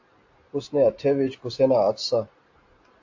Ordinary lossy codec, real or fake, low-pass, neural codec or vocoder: AAC, 48 kbps; real; 7.2 kHz; none